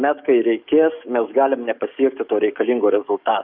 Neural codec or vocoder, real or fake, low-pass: codec, 44.1 kHz, 7.8 kbps, DAC; fake; 5.4 kHz